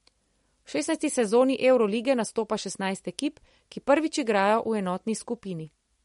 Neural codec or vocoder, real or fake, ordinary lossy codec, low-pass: none; real; MP3, 48 kbps; 19.8 kHz